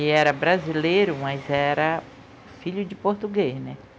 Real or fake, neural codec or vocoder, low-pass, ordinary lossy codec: real; none; none; none